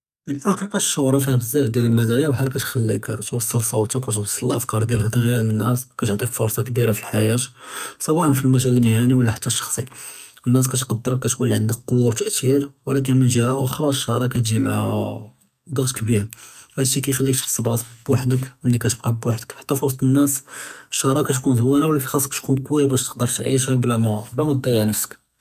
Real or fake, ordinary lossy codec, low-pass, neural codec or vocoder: fake; none; 14.4 kHz; codec, 44.1 kHz, 2.6 kbps, SNAC